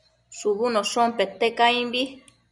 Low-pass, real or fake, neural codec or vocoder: 10.8 kHz; real; none